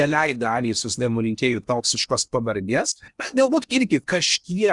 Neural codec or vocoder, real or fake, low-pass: codec, 16 kHz in and 24 kHz out, 0.8 kbps, FocalCodec, streaming, 65536 codes; fake; 10.8 kHz